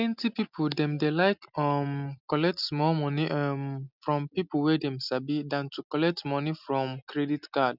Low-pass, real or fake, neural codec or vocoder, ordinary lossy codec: 5.4 kHz; real; none; none